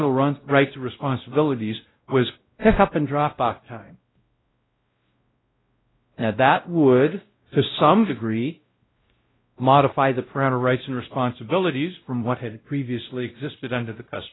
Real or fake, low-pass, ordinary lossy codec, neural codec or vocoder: fake; 7.2 kHz; AAC, 16 kbps; codec, 16 kHz, 0.5 kbps, X-Codec, WavLM features, trained on Multilingual LibriSpeech